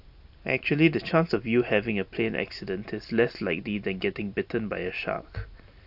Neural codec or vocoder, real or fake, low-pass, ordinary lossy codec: none; real; 5.4 kHz; AAC, 48 kbps